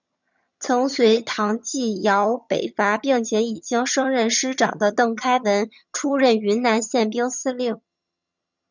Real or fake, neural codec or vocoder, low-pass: fake; vocoder, 22.05 kHz, 80 mel bands, HiFi-GAN; 7.2 kHz